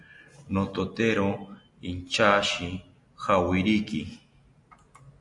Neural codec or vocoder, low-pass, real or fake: none; 10.8 kHz; real